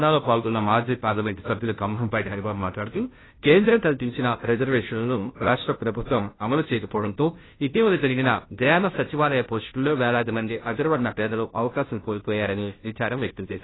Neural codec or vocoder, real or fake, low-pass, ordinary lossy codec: codec, 16 kHz, 0.5 kbps, FunCodec, trained on Chinese and English, 25 frames a second; fake; 7.2 kHz; AAC, 16 kbps